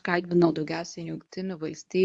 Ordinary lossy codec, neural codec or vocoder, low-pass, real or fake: AAC, 64 kbps; codec, 24 kHz, 0.9 kbps, WavTokenizer, medium speech release version 2; 10.8 kHz; fake